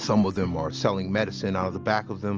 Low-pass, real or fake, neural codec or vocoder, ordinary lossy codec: 7.2 kHz; real; none; Opus, 24 kbps